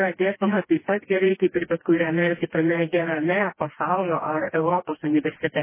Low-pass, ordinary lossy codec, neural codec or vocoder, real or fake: 3.6 kHz; MP3, 16 kbps; codec, 16 kHz, 1 kbps, FreqCodec, smaller model; fake